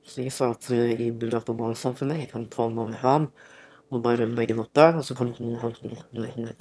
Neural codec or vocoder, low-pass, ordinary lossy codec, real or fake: autoencoder, 22.05 kHz, a latent of 192 numbers a frame, VITS, trained on one speaker; none; none; fake